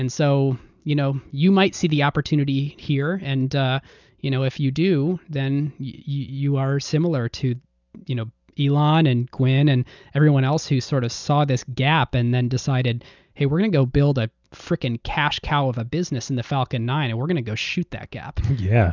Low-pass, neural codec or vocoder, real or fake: 7.2 kHz; none; real